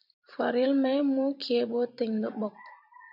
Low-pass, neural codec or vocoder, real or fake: 5.4 kHz; vocoder, 44.1 kHz, 128 mel bands every 256 samples, BigVGAN v2; fake